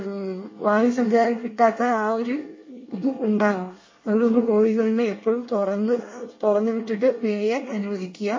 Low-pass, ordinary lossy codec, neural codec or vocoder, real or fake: 7.2 kHz; MP3, 32 kbps; codec, 24 kHz, 1 kbps, SNAC; fake